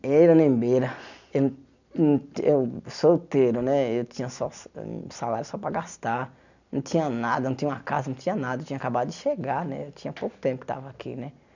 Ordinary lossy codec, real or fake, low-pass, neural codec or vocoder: none; real; 7.2 kHz; none